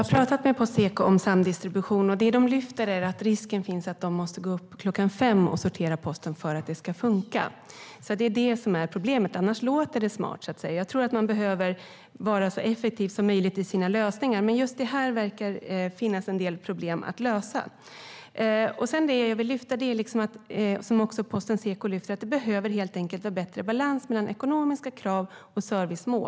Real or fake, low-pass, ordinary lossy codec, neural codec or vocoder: real; none; none; none